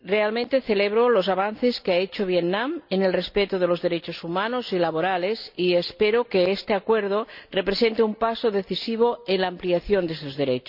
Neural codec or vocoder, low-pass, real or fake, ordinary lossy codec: none; 5.4 kHz; real; none